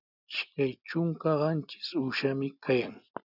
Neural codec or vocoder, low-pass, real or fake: none; 5.4 kHz; real